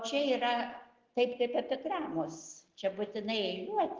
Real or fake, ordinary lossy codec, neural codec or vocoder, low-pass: real; Opus, 16 kbps; none; 7.2 kHz